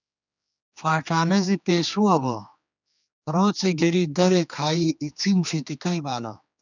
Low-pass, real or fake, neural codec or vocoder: 7.2 kHz; fake; codec, 16 kHz, 2 kbps, X-Codec, HuBERT features, trained on general audio